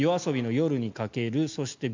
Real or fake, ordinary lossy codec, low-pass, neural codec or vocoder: real; MP3, 64 kbps; 7.2 kHz; none